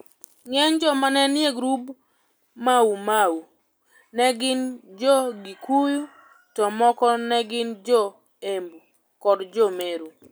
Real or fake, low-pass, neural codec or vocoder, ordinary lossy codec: real; none; none; none